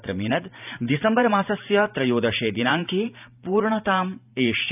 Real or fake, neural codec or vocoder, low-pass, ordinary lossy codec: fake; vocoder, 44.1 kHz, 128 mel bands every 512 samples, BigVGAN v2; 3.6 kHz; none